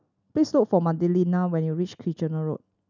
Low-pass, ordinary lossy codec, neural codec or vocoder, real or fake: 7.2 kHz; none; none; real